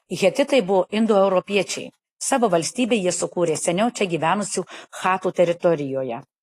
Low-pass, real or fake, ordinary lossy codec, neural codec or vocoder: 14.4 kHz; real; AAC, 48 kbps; none